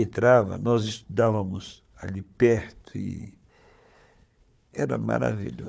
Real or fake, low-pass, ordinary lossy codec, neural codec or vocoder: fake; none; none; codec, 16 kHz, 4 kbps, FunCodec, trained on Chinese and English, 50 frames a second